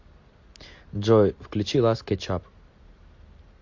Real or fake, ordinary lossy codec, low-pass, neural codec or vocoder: real; MP3, 48 kbps; 7.2 kHz; none